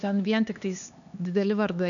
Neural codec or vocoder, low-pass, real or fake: codec, 16 kHz, 2 kbps, X-Codec, HuBERT features, trained on LibriSpeech; 7.2 kHz; fake